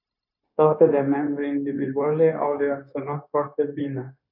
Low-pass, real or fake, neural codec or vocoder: 5.4 kHz; fake; codec, 16 kHz, 0.9 kbps, LongCat-Audio-Codec